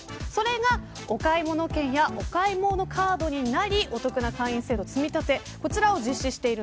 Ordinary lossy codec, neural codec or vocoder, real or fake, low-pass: none; none; real; none